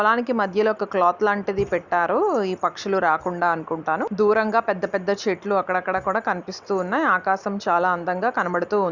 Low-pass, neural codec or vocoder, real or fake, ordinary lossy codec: 7.2 kHz; none; real; Opus, 64 kbps